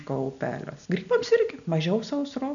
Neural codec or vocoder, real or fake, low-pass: none; real; 7.2 kHz